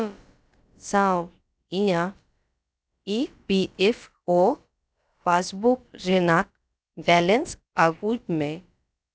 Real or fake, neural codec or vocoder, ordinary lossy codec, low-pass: fake; codec, 16 kHz, about 1 kbps, DyCAST, with the encoder's durations; none; none